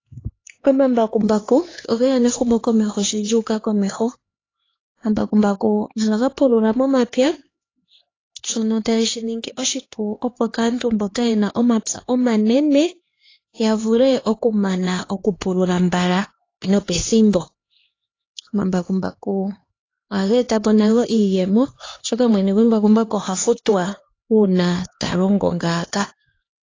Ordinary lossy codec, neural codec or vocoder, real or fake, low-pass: AAC, 32 kbps; codec, 16 kHz, 2 kbps, X-Codec, HuBERT features, trained on LibriSpeech; fake; 7.2 kHz